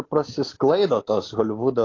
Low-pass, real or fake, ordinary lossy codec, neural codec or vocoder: 7.2 kHz; real; AAC, 32 kbps; none